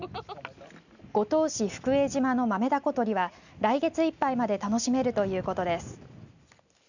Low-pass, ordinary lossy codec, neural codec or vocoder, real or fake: 7.2 kHz; none; none; real